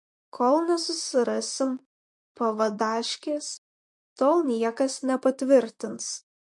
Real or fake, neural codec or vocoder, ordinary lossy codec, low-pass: fake; vocoder, 44.1 kHz, 128 mel bands, Pupu-Vocoder; MP3, 48 kbps; 10.8 kHz